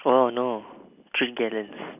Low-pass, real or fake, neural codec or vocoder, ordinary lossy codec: 3.6 kHz; real; none; none